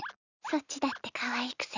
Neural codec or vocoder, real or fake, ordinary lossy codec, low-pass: none; real; Opus, 64 kbps; 7.2 kHz